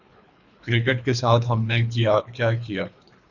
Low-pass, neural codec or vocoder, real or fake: 7.2 kHz; codec, 24 kHz, 3 kbps, HILCodec; fake